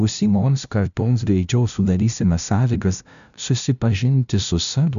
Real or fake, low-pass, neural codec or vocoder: fake; 7.2 kHz; codec, 16 kHz, 0.5 kbps, FunCodec, trained on LibriTTS, 25 frames a second